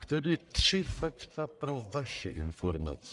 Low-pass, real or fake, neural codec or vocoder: 10.8 kHz; fake; codec, 44.1 kHz, 1.7 kbps, Pupu-Codec